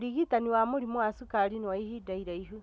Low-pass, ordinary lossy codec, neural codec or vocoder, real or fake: none; none; none; real